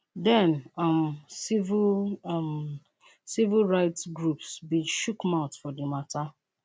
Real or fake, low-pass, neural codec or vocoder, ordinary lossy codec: real; none; none; none